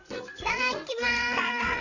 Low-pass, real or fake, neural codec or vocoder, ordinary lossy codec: 7.2 kHz; fake; codec, 16 kHz, 8 kbps, FreqCodec, larger model; none